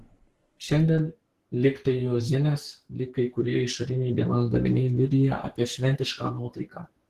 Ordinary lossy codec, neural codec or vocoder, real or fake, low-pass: Opus, 16 kbps; codec, 44.1 kHz, 2.6 kbps, SNAC; fake; 14.4 kHz